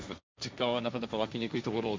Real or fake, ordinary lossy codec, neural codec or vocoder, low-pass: fake; none; codec, 16 kHz, 1.1 kbps, Voila-Tokenizer; none